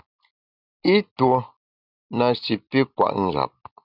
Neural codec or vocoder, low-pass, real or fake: none; 5.4 kHz; real